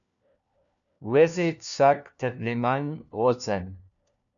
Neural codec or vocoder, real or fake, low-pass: codec, 16 kHz, 1 kbps, FunCodec, trained on LibriTTS, 50 frames a second; fake; 7.2 kHz